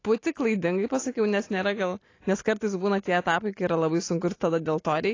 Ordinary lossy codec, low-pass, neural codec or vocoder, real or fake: AAC, 32 kbps; 7.2 kHz; none; real